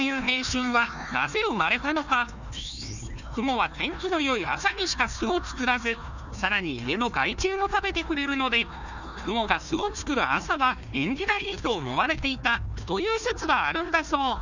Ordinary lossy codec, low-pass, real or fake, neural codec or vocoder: none; 7.2 kHz; fake; codec, 16 kHz, 1 kbps, FunCodec, trained on Chinese and English, 50 frames a second